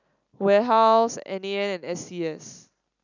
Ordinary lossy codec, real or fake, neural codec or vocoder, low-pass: none; real; none; 7.2 kHz